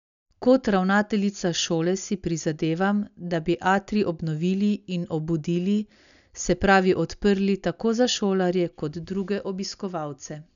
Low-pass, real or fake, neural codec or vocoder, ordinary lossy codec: 7.2 kHz; real; none; none